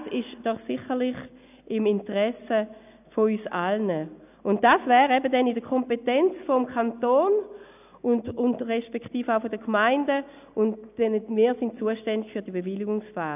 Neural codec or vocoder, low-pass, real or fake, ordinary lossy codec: none; 3.6 kHz; real; none